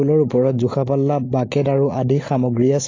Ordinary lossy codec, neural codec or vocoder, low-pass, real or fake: AAC, 32 kbps; none; 7.2 kHz; real